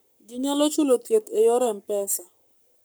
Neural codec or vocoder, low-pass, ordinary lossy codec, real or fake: codec, 44.1 kHz, 7.8 kbps, Pupu-Codec; none; none; fake